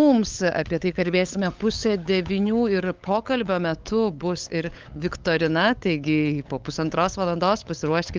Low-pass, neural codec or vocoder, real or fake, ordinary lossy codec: 7.2 kHz; codec, 16 kHz, 8 kbps, FunCodec, trained on LibriTTS, 25 frames a second; fake; Opus, 32 kbps